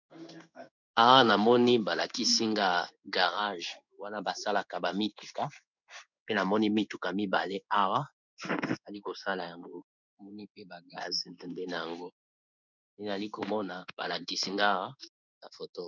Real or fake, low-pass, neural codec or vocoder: fake; 7.2 kHz; codec, 16 kHz in and 24 kHz out, 1 kbps, XY-Tokenizer